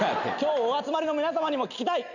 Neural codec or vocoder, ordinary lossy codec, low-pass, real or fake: none; none; 7.2 kHz; real